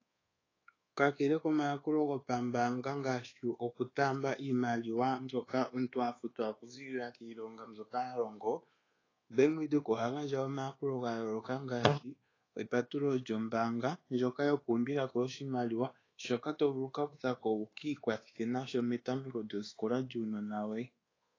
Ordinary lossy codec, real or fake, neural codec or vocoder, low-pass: AAC, 32 kbps; fake; codec, 24 kHz, 1.2 kbps, DualCodec; 7.2 kHz